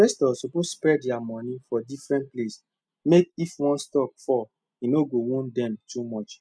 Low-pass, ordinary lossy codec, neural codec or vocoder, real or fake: none; none; none; real